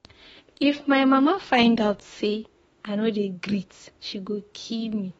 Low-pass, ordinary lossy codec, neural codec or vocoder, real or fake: 19.8 kHz; AAC, 24 kbps; autoencoder, 48 kHz, 32 numbers a frame, DAC-VAE, trained on Japanese speech; fake